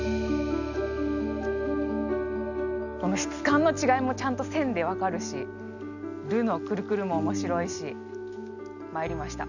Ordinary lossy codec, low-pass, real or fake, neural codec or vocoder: none; 7.2 kHz; real; none